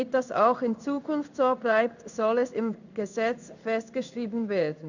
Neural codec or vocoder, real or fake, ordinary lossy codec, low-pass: codec, 16 kHz in and 24 kHz out, 1 kbps, XY-Tokenizer; fake; none; 7.2 kHz